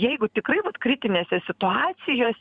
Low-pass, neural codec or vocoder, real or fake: 9.9 kHz; vocoder, 44.1 kHz, 128 mel bands every 512 samples, BigVGAN v2; fake